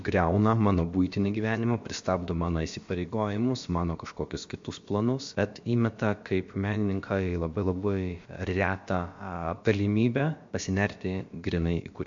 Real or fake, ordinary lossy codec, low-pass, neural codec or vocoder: fake; MP3, 48 kbps; 7.2 kHz; codec, 16 kHz, about 1 kbps, DyCAST, with the encoder's durations